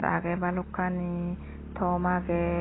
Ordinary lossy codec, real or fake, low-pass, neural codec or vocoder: AAC, 16 kbps; real; 7.2 kHz; none